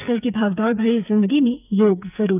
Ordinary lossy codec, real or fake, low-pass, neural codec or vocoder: none; fake; 3.6 kHz; codec, 44.1 kHz, 2.6 kbps, SNAC